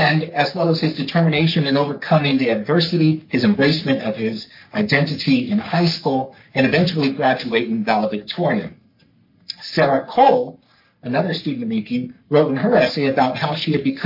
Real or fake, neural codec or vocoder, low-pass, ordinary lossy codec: fake; codec, 44.1 kHz, 3.4 kbps, Pupu-Codec; 5.4 kHz; MP3, 32 kbps